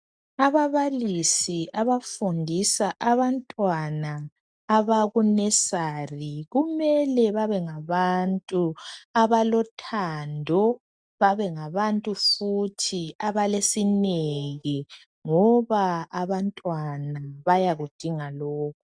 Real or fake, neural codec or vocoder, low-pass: real; none; 9.9 kHz